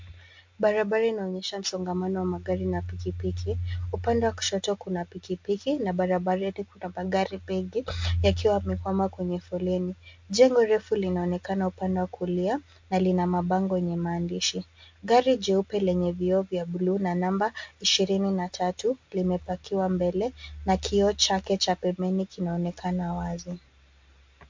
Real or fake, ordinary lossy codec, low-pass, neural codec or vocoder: real; MP3, 48 kbps; 7.2 kHz; none